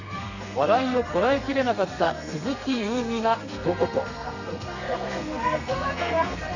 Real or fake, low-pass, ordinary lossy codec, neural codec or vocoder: fake; 7.2 kHz; none; codec, 32 kHz, 1.9 kbps, SNAC